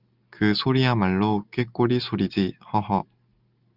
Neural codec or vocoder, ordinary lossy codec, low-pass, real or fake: none; Opus, 24 kbps; 5.4 kHz; real